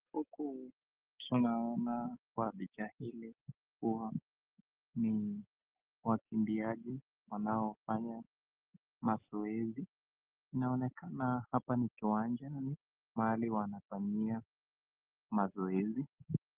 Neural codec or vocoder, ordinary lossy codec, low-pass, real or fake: none; Opus, 16 kbps; 3.6 kHz; real